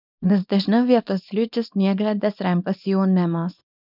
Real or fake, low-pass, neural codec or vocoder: fake; 5.4 kHz; codec, 24 kHz, 0.9 kbps, WavTokenizer, small release